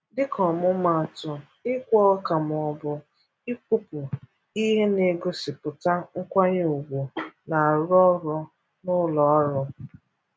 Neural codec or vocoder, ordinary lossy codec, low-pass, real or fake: none; none; none; real